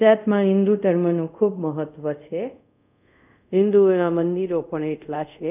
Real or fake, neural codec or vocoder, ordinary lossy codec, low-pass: fake; codec, 24 kHz, 0.5 kbps, DualCodec; none; 3.6 kHz